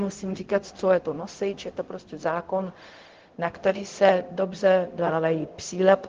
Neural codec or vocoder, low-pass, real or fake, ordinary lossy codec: codec, 16 kHz, 0.4 kbps, LongCat-Audio-Codec; 7.2 kHz; fake; Opus, 16 kbps